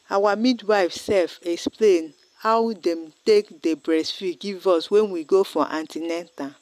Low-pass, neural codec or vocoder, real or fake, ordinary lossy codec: 14.4 kHz; none; real; none